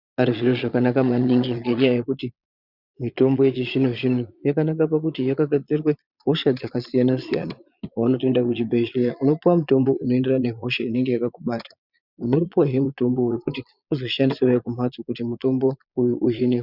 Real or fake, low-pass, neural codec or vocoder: fake; 5.4 kHz; vocoder, 22.05 kHz, 80 mel bands, Vocos